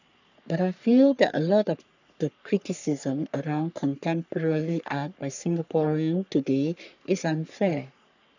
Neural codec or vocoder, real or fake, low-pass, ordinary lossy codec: codec, 44.1 kHz, 3.4 kbps, Pupu-Codec; fake; 7.2 kHz; none